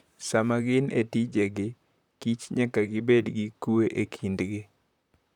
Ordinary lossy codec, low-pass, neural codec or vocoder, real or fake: none; 19.8 kHz; vocoder, 44.1 kHz, 128 mel bands, Pupu-Vocoder; fake